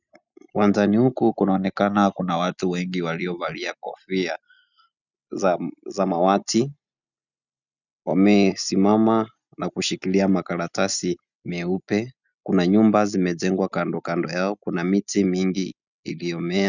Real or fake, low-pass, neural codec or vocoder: real; 7.2 kHz; none